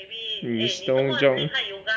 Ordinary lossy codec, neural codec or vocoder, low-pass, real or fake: none; none; none; real